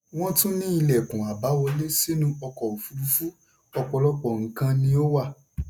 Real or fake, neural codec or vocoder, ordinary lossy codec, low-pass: real; none; none; none